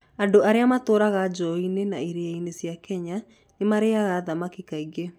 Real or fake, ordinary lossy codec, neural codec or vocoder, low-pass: real; none; none; 19.8 kHz